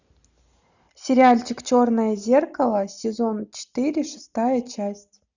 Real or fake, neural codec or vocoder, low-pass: real; none; 7.2 kHz